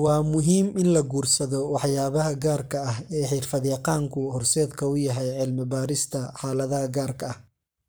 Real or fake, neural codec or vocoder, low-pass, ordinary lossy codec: fake; codec, 44.1 kHz, 7.8 kbps, Pupu-Codec; none; none